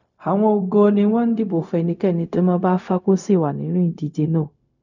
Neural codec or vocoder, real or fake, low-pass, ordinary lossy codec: codec, 16 kHz, 0.4 kbps, LongCat-Audio-Codec; fake; 7.2 kHz; none